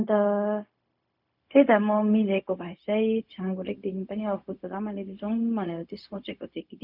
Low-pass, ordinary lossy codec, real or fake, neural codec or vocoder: 5.4 kHz; none; fake; codec, 16 kHz, 0.4 kbps, LongCat-Audio-Codec